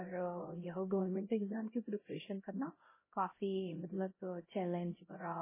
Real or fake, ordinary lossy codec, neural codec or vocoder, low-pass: fake; MP3, 16 kbps; codec, 16 kHz, 0.5 kbps, X-Codec, HuBERT features, trained on LibriSpeech; 3.6 kHz